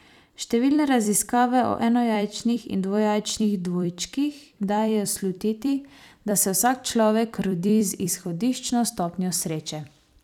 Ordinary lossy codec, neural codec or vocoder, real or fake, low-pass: none; vocoder, 44.1 kHz, 128 mel bands every 256 samples, BigVGAN v2; fake; 19.8 kHz